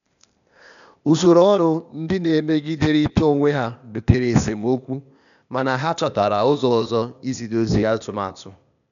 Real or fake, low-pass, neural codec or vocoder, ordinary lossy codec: fake; 7.2 kHz; codec, 16 kHz, 0.8 kbps, ZipCodec; none